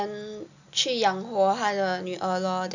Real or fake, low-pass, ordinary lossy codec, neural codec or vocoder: real; 7.2 kHz; none; none